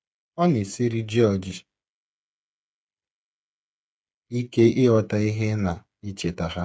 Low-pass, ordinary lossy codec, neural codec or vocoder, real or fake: none; none; codec, 16 kHz, 8 kbps, FreqCodec, smaller model; fake